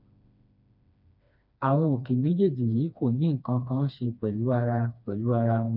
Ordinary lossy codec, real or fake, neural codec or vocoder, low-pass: none; fake; codec, 16 kHz, 2 kbps, FreqCodec, smaller model; 5.4 kHz